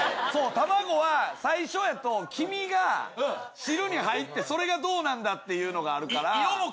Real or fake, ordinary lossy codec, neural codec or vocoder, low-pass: real; none; none; none